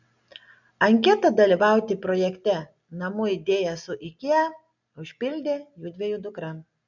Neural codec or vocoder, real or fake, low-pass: none; real; 7.2 kHz